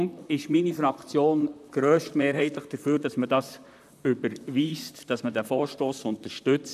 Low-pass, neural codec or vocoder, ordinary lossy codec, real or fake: 14.4 kHz; vocoder, 44.1 kHz, 128 mel bands, Pupu-Vocoder; none; fake